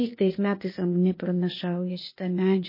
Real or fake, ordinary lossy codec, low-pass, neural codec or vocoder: fake; MP3, 24 kbps; 5.4 kHz; codec, 16 kHz, 0.8 kbps, ZipCodec